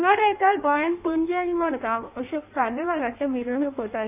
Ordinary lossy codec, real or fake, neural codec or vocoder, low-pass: none; fake; codec, 16 kHz in and 24 kHz out, 1.1 kbps, FireRedTTS-2 codec; 3.6 kHz